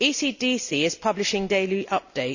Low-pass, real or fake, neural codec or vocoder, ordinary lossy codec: 7.2 kHz; real; none; none